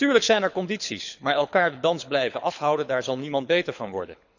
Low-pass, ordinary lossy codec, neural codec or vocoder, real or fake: 7.2 kHz; none; codec, 24 kHz, 6 kbps, HILCodec; fake